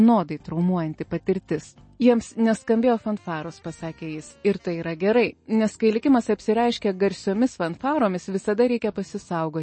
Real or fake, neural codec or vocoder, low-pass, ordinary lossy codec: real; none; 9.9 kHz; MP3, 32 kbps